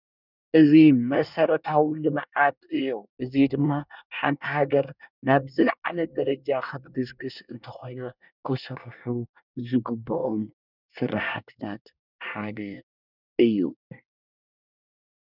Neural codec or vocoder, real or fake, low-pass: codec, 24 kHz, 1 kbps, SNAC; fake; 5.4 kHz